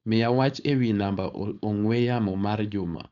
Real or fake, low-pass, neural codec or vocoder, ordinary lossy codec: fake; 7.2 kHz; codec, 16 kHz, 4.8 kbps, FACodec; none